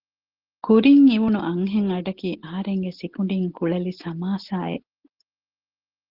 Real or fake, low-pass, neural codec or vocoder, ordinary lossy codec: real; 5.4 kHz; none; Opus, 16 kbps